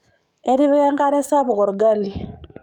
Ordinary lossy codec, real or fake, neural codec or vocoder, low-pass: none; fake; codec, 44.1 kHz, 7.8 kbps, DAC; 19.8 kHz